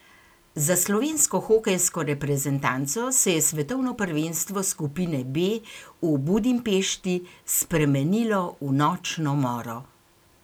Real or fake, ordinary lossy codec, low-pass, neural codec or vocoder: real; none; none; none